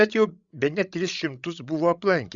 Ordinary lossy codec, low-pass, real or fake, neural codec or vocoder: Opus, 64 kbps; 7.2 kHz; fake; codec, 16 kHz, 16 kbps, FreqCodec, larger model